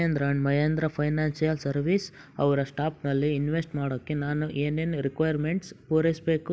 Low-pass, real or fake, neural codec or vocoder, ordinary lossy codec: none; real; none; none